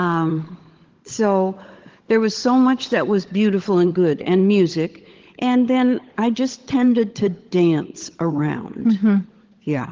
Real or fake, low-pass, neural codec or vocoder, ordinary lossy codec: fake; 7.2 kHz; codec, 16 kHz, 16 kbps, FunCodec, trained on LibriTTS, 50 frames a second; Opus, 16 kbps